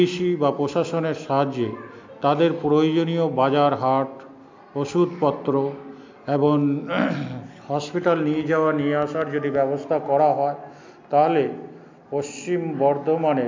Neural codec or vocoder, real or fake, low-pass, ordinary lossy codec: none; real; 7.2 kHz; MP3, 64 kbps